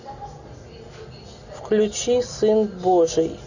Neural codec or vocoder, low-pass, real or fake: none; 7.2 kHz; real